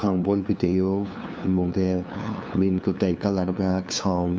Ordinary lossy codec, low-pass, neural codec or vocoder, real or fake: none; none; codec, 16 kHz, 2 kbps, FunCodec, trained on LibriTTS, 25 frames a second; fake